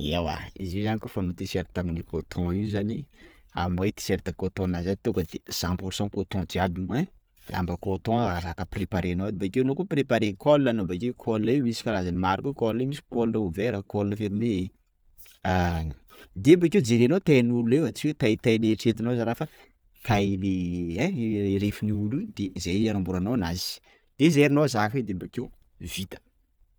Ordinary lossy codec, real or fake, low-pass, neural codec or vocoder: none; real; none; none